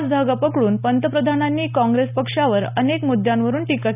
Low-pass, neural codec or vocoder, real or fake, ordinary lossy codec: 3.6 kHz; none; real; none